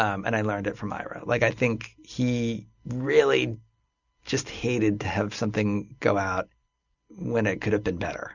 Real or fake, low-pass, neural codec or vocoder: real; 7.2 kHz; none